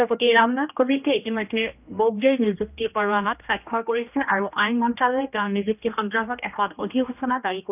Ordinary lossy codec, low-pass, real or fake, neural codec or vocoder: none; 3.6 kHz; fake; codec, 16 kHz, 1 kbps, X-Codec, HuBERT features, trained on general audio